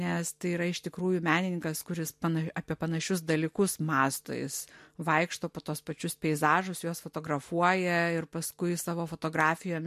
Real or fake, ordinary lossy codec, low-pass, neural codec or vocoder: real; MP3, 64 kbps; 14.4 kHz; none